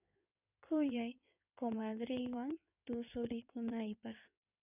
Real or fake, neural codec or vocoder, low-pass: fake; codec, 16 kHz in and 24 kHz out, 2.2 kbps, FireRedTTS-2 codec; 3.6 kHz